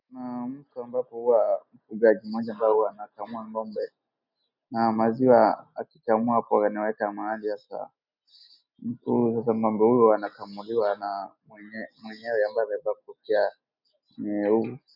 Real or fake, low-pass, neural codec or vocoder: real; 5.4 kHz; none